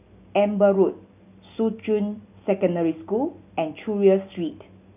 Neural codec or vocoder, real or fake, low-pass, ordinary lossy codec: none; real; 3.6 kHz; none